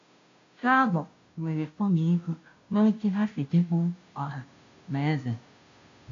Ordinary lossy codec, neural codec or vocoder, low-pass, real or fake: AAC, 48 kbps; codec, 16 kHz, 0.5 kbps, FunCodec, trained on Chinese and English, 25 frames a second; 7.2 kHz; fake